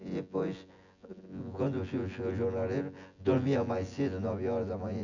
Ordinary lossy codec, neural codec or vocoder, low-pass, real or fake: none; vocoder, 24 kHz, 100 mel bands, Vocos; 7.2 kHz; fake